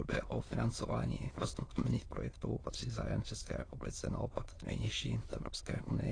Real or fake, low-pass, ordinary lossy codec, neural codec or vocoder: fake; 9.9 kHz; AAC, 32 kbps; autoencoder, 22.05 kHz, a latent of 192 numbers a frame, VITS, trained on many speakers